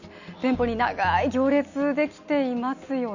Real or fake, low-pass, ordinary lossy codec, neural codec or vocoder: real; 7.2 kHz; none; none